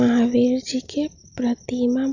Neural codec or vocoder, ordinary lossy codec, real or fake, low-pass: none; none; real; 7.2 kHz